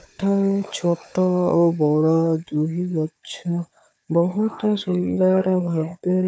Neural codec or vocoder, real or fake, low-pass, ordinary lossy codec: codec, 16 kHz, 4 kbps, FunCodec, trained on Chinese and English, 50 frames a second; fake; none; none